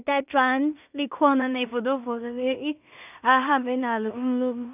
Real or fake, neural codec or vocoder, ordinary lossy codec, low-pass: fake; codec, 16 kHz in and 24 kHz out, 0.4 kbps, LongCat-Audio-Codec, two codebook decoder; none; 3.6 kHz